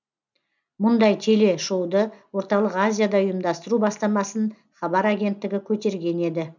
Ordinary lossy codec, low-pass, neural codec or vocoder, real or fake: none; 7.2 kHz; none; real